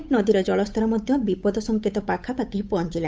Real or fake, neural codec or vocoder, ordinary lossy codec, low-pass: fake; codec, 16 kHz, 8 kbps, FunCodec, trained on Chinese and English, 25 frames a second; none; none